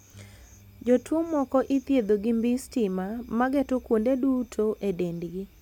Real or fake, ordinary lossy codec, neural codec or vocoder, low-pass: real; none; none; 19.8 kHz